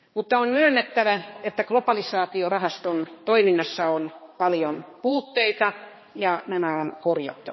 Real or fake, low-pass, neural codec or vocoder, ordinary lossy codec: fake; 7.2 kHz; codec, 16 kHz, 2 kbps, X-Codec, HuBERT features, trained on balanced general audio; MP3, 24 kbps